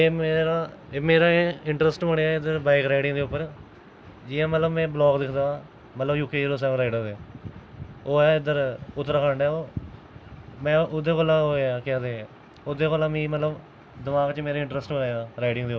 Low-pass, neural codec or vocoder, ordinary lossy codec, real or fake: none; none; none; real